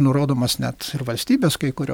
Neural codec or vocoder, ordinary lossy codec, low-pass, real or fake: none; MP3, 96 kbps; 19.8 kHz; real